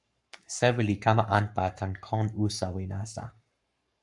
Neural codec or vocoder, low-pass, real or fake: codec, 44.1 kHz, 7.8 kbps, Pupu-Codec; 10.8 kHz; fake